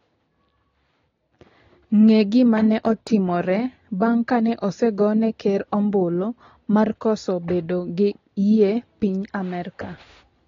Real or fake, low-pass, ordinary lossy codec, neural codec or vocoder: real; 7.2 kHz; AAC, 32 kbps; none